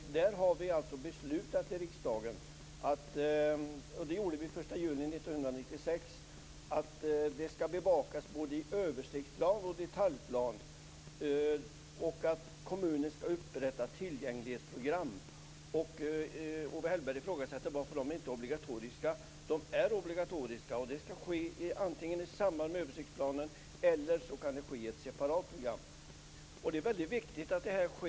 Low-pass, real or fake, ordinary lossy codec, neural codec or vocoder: none; real; none; none